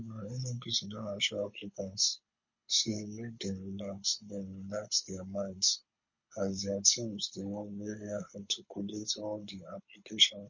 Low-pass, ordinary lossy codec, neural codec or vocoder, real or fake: 7.2 kHz; MP3, 32 kbps; codec, 24 kHz, 6 kbps, HILCodec; fake